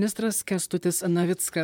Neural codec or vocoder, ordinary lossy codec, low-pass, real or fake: vocoder, 44.1 kHz, 128 mel bands, Pupu-Vocoder; MP3, 96 kbps; 19.8 kHz; fake